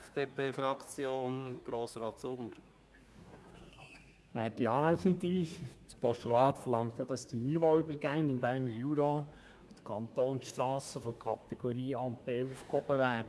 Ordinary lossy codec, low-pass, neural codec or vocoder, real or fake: none; none; codec, 24 kHz, 1 kbps, SNAC; fake